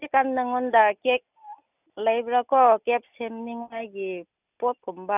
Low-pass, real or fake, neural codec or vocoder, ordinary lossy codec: 3.6 kHz; real; none; none